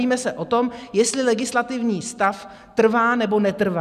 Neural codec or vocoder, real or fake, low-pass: none; real; 14.4 kHz